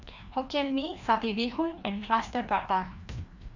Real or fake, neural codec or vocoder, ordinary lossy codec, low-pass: fake; codec, 16 kHz, 1 kbps, FreqCodec, larger model; none; 7.2 kHz